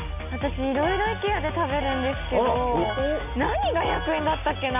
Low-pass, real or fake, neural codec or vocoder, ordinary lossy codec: 3.6 kHz; real; none; none